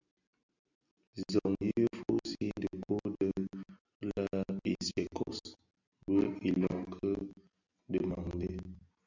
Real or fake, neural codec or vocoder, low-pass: real; none; 7.2 kHz